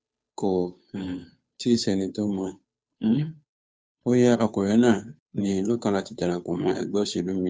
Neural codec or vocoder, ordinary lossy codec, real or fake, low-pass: codec, 16 kHz, 2 kbps, FunCodec, trained on Chinese and English, 25 frames a second; none; fake; none